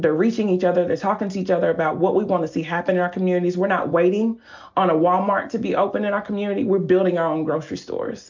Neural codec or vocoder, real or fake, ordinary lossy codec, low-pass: none; real; MP3, 64 kbps; 7.2 kHz